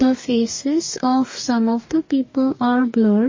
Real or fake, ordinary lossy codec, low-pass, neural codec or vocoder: fake; MP3, 32 kbps; 7.2 kHz; codec, 44.1 kHz, 2.6 kbps, DAC